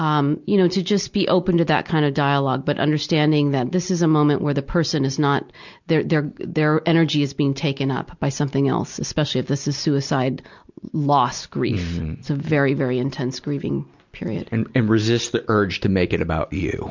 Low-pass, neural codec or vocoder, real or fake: 7.2 kHz; none; real